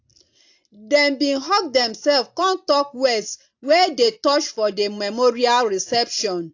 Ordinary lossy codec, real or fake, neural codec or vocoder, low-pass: AAC, 48 kbps; real; none; 7.2 kHz